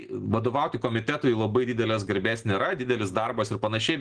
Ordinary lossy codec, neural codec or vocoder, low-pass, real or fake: Opus, 16 kbps; none; 9.9 kHz; real